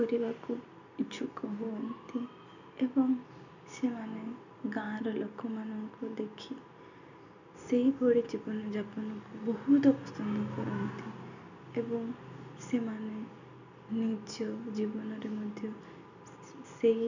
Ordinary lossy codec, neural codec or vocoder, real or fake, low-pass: none; none; real; 7.2 kHz